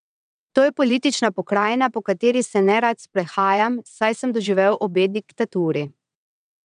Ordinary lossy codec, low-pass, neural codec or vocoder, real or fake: none; 9.9 kHz; vocoder, 22.05 kHz, 80 mel bands, WaveNeXt; fake